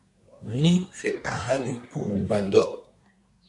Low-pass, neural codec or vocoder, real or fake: 10.8 kHz; codec, 24 kHz, 1 kbps, SNAC; fake